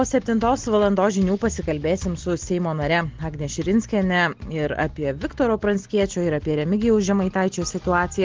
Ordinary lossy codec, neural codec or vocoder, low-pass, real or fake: Opus, 16 kbps; none; 7.2 kHz; real